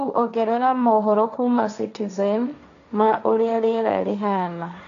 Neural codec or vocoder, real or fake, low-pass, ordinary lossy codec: codec, 16 kHz, 1.1 kbps, Voila-Tokenizer; fake; 7.2 kHz; none